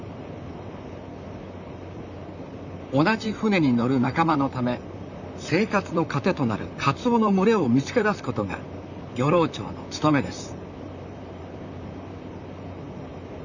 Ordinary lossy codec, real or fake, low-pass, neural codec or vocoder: none; fake; 7.2 kHz; vocoder, 44.1 kHz, 128 mel bands, Pupu-Vocoder